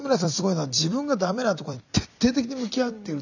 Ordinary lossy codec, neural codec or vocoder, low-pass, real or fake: none; vocoder, 44.1 kHz, 128 mel bands every 256 samples, BigVGAN v2; 7.2 kHz; fake